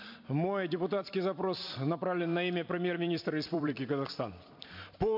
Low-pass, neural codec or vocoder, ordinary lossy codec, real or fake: 5.4 kHz; vocoder, 44.1 kHz, 128 mel bands every 256 samples, BigVGAN v2; MP3, 48 kbps; fake